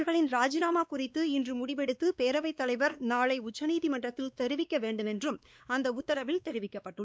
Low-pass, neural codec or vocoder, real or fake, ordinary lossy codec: none; codec, 16 kHz, 2 kbps, X-Codec, WavLM features, trained on Multilingual LibriSpeech; fake; none